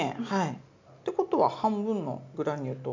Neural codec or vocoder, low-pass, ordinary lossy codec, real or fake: none; 7.2 kHz; MP3, 64 kbps; real